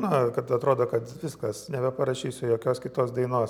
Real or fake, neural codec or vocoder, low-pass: real; none; 19.8 kHz